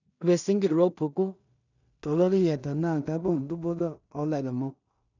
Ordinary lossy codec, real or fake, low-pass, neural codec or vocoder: none; fake; 7.2 kHz; codec, 16 kHz in and 24 kHz out, 0.4 kbps, LongCat-Audio-Codec, two codebook decoder